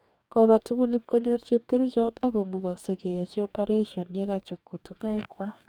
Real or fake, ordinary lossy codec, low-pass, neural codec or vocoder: fake; none; 19.8 kHz; codec, 44.1 kHz, 2.6 kbps, DAC